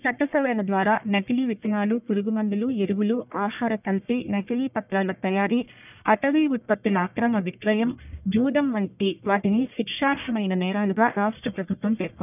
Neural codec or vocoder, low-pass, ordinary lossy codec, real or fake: codec, 44.1 kHz, 1.7 kbps, Pupu-Codec; 3.6 kHz; none; fake